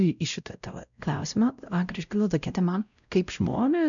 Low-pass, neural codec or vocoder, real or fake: 7.2 kHz; codec, 16 kHz, 0.5 kbps, X-Codec, WavLM features, trained on Multilingual LibriSpeech; fake